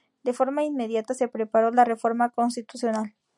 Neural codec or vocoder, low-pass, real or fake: none; 10.8 kHz; real